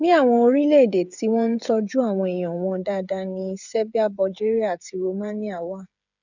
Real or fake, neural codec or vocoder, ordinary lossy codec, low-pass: fake; codec, 16 kHz, 8 kbps, FreqCodec, smaller model; none; 7.2 kHz